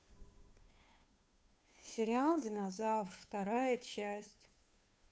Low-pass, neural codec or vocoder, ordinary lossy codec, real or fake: none; codec, 16 kHz, 2 kbps, FunCodec, trained on Chinese and English, 25 frames a second; none; fake